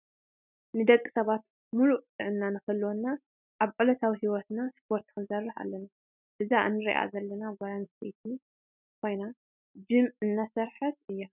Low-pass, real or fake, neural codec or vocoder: 3.6 kHz; real; none